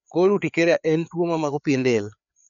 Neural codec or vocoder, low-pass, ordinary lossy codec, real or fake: codec, 16 kHz, 4 kbps, FreqCodec, larger model; 7.2 kHz; none; fake